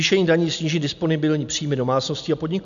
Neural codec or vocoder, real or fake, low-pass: none; real; 7.2 kHz